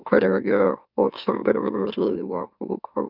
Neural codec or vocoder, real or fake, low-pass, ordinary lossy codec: autoencoder, 44.1 kHz, a latent of 192 numbers a frame, MeloTTS; fake; 5.4 kHz; none